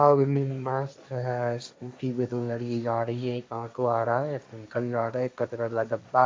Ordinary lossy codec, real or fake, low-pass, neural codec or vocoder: none; fake; none; codec, 16 kHz, 1.1 kbps, Voila-Tokenizer